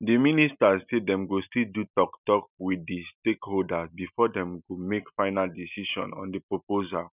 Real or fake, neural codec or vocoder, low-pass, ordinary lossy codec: real; none; 3.6 kHz; none